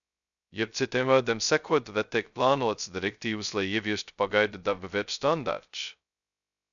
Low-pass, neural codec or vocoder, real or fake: 7.2 kHz; codec, 16 kHz, 0.2 kbps, FocalCodec; fake